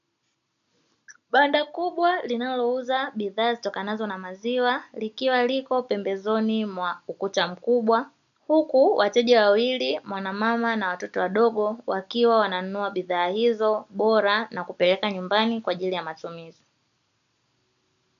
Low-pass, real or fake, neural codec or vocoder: 7.2 kHz; real; none